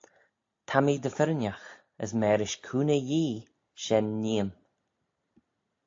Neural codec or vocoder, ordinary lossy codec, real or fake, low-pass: none; AAC, 48 kbps; real; 7.2 kHz